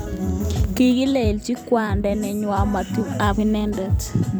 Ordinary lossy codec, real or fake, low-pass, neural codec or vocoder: none; fake; none; vocoder, 44.1 kHz, 128 mel bands every 256 samples, BigVGAN v2